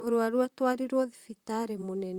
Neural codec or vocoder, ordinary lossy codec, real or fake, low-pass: vocoder, 44.1 kHz, 128 mel bands, Pupu-Vocoder; Opus, 64 kbps; fake; 19.8 kHz